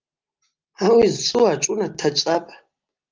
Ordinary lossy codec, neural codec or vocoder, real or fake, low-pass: Opus, 32 kbps; none; real; 7.2 kHz